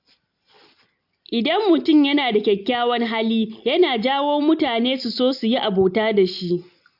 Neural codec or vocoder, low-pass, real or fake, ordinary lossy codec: none; 5.4 kHz; real; AAC, 48 kbps